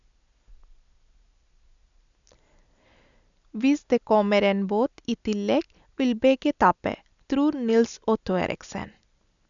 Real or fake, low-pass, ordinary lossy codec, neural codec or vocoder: real; 7.2 kHz; none; none